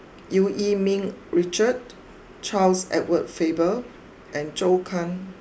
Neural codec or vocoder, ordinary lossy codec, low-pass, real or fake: none; none; none; real